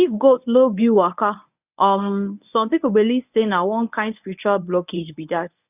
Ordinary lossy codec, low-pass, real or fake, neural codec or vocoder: none; 3.6 kHz; fake; codec, 24 kHz, 0.9 kbps, WavTokenizer, medium speech release version 1